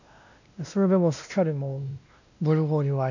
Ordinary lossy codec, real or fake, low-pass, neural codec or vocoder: none; fake; 7.2 kHz; codec, 16 kHz, 1 kbps, X-Codec, WavLM features, trained on Multilingual LibriSpeech